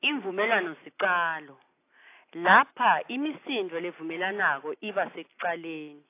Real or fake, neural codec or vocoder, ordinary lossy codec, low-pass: real; none; AAC, 24 kbps; 3.6 kHz